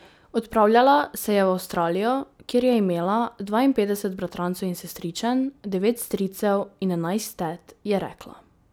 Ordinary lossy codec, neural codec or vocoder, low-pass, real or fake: none; none; none; real